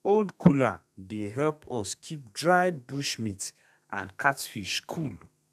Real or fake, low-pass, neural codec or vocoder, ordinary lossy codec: fake; 14.4 kHz; codec, 32 kHz, 1.9 kbps, SNAC; none